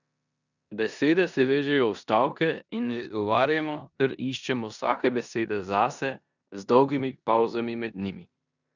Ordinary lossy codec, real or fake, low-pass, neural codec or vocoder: none; fake; 7.2 kHz; codec, 16 kHz in and 24 kHz out, 0.9 kbps, LongCat-Audio-Codec, four codebook decoder